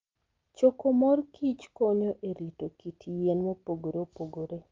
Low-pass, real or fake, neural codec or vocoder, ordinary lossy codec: 7.2 kHz; real; none; Opus, 32 kbps